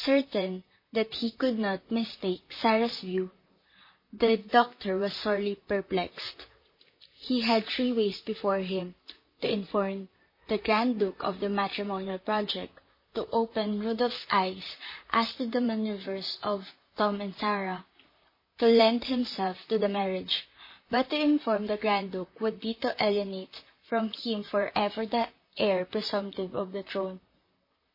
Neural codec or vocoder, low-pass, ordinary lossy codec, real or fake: vocoder, 44.1 kHz, 128 mel bands, Pupu-Vocoder; 5.4 kHz; MP3, 24 kbps; fake